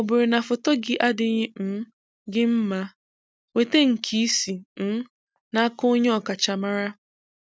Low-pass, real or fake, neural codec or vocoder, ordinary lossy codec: none; real; none; none